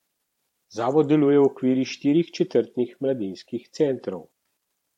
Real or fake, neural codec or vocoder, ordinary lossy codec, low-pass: fake; vocoder, 44.1 kHz, 128 mel bands every 512 samples, BigVGAN v2; MP3, 64 kbps; 19.8 kHz